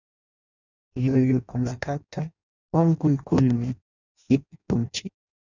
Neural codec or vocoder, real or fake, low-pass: codec, 16 kHz in and 24 kHz out, 0.6 kbps, FireRedTTS-2 codec; fake; 7.2 kHz